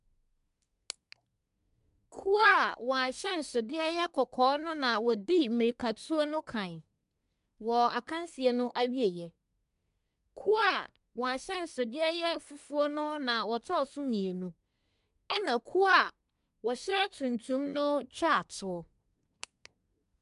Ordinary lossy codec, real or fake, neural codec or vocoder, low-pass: AAC, 96 kbps; fake; codec, 24 kHz, 1 kbps, SNAC; 10.8 kHz